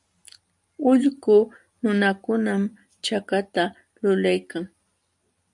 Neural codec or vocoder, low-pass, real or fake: none; 10.8 kHz; real